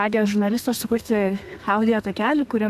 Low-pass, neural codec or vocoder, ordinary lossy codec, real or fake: 14.4 kHz; codec, 32 kHz, 1.9 kbps, SNAC; MP3, 96 kbps; fake